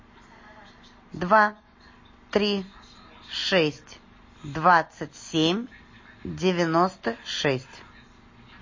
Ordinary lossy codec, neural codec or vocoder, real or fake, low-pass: MP3, 32 kbps; none; real; 7.2 kHz